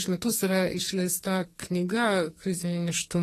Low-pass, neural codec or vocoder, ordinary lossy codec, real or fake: 14.4 kHz; codec, 44.1 kHz, 2.6 kbps, SNAC; AAC, 48 kbps; fake